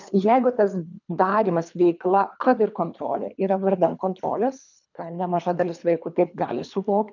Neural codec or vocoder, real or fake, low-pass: codec, 24 kHz, 3 kbps, HILCodec; fake; 7.2 kHz